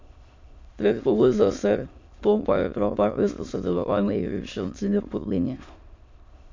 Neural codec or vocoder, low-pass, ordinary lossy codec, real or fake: autoencoder, 22.05 kHz, a latent of 192 numbers a frame, VITS, trained on many speakers; 7.2 kHz; MP3, 48 kbps; fake